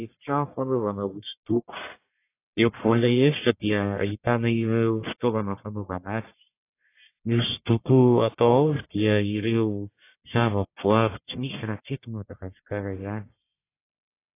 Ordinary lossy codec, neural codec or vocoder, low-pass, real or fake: AAC, 24 kbps; codec, 44.1 kHz, 1.7 kbps, Pupu-Codec; 3.6 kHz; fake